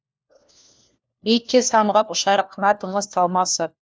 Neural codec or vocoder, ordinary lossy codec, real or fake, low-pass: codec, 16 kHz, 1 kbps, FunCodec, trained on LibriTTS, 50 frames a second; none; fake; none